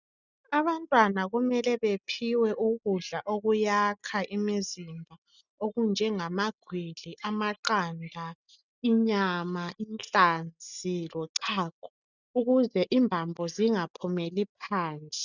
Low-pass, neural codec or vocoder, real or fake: 7.2 kHz; none; real